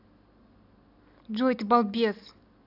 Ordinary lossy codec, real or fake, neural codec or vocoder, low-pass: AAC, 48 kbps; real; none; 5.4 kHz